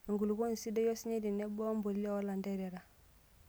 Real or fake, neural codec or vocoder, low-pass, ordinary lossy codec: real; none; none; none